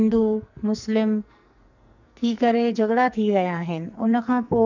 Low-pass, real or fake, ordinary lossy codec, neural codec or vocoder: 7.2 kHz; fake; none; codec, 44.1 kHz, 2.6 kbps, SNAC